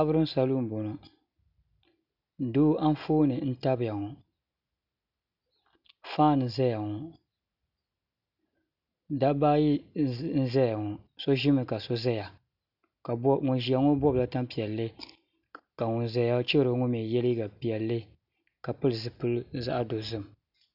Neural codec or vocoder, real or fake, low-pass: none; real; 5.4 kHz